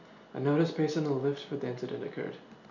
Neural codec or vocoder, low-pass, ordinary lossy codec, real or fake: none; 7.2 kHz; none; real